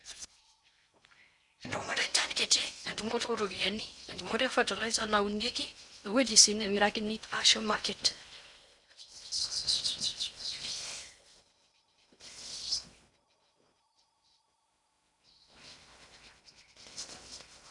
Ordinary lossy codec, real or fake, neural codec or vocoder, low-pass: none; fake; codec, 16 kHz in and 24 kHz out, 0.6 kbps, FocalCodec, streaming, 4096 codes; 10.8 kHz